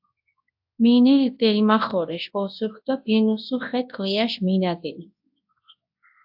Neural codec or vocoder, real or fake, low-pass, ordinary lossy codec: codec, 24 kHz, 0.9 kbps, WavTokenizer, large speech release; fake; 5.4 kHz; AAC, 48 kbps